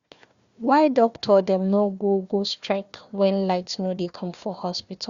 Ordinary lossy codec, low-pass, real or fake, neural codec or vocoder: Opus, 64 kbps; 7.2 kHz; fake; codec, 16 kHz, 1 kbps, FunCodec, trained on Chinese and English, 50 frames a second